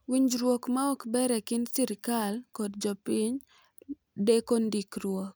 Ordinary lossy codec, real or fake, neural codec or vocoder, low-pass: none; real; none; none